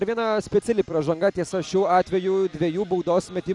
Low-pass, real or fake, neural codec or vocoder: 10.8 kHz; real; none